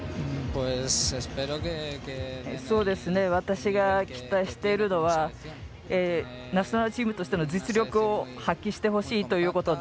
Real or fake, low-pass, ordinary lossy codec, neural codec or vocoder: real; none; none; none